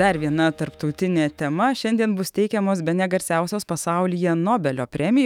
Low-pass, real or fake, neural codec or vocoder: 19.8 kHz; fake; autoencoder, 48 kHz, 128 numbers a frame, DAC-VAE, trained on Japanese speech